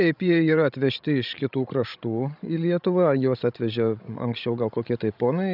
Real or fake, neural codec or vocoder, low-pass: fake; codec, 16 kHz, 16 kbps, FreqCodec, larger model; 5.4 kHz